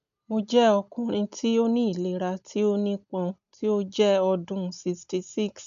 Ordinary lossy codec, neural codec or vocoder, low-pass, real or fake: none; none; 7.2 kHz; real